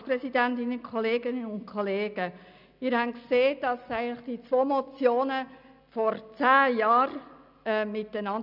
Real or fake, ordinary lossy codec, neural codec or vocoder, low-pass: real; none; none; 5.4 kHz